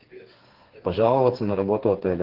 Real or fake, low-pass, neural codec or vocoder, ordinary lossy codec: fake; 5.4 kHz; codec, 16 kHz, 4 kbps, FreqCodec, smaller model; Opus, 16 kbps